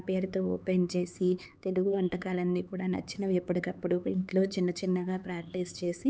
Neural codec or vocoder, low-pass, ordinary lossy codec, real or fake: codec, 16 kHz, 4 kbps, X-Codec, HuBERT features, trained on LibriSpeech; none; none; fake